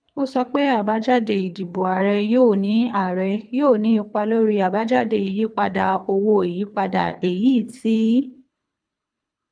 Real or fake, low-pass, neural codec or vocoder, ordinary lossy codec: fake; 9.9 kHz; codec, 24 kHz, 3 kbps, HILCodec; none